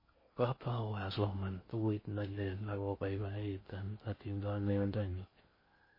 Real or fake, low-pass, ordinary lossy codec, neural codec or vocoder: fake; 5.4 kHz; MP3, 24 kbps; codec, 16 kHz in and 24 kHz out, 0.6 kbps, FocalCodec, streaming, 4096 codes